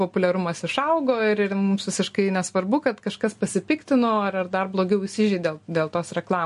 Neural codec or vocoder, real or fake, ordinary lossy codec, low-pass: none; real; MP3, 48 kbps; 14.4 kHz